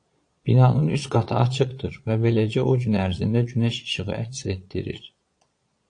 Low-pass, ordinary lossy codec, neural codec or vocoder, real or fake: 9.9 kHz; AAC, 48 kbps; vocoder, 22.05 kHz, 80 mel bands, Vocos; fake